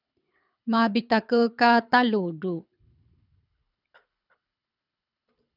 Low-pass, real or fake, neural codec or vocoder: 5.4 kHz; fake; codec, 24 kHz, 6 kbps, HILCodec